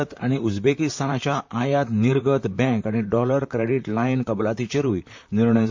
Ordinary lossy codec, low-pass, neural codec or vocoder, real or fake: MP3, 48 kbps; 7.2 kHz; vocoder, 44.1 kHz, 128 mel bands, Pupu-Vocoder; fake